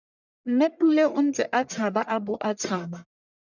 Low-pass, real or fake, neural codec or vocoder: 7.2 kHz; fake; codec, 44.1 kHz, 1.7 kbps, Pupu-Codec